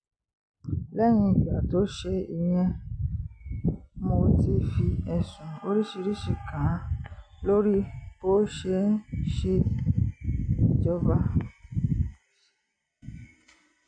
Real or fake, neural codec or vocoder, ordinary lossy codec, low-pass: real; none; none; 9.9 kHz